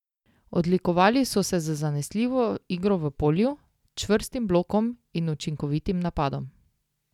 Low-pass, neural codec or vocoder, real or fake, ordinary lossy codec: 19.8 kHz; none; real; none